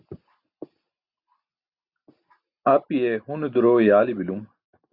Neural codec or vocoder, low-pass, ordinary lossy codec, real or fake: none; 5.4 kHz; Opus, 64 kbps; real